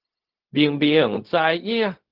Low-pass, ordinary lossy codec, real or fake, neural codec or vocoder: 5.4 kHz; Opus, 16 kbps; fake; codec, 16 kHz, 0.4 kbps, LongCat-Audio-Codec